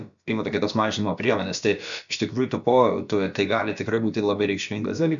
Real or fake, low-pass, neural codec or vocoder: fake; 7.2 kHz; codec, 16 kHz, about 1 kbps, DyCAST, with the encoder's durations